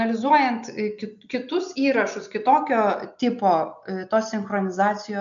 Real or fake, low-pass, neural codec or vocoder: real; 7.2 kHz; none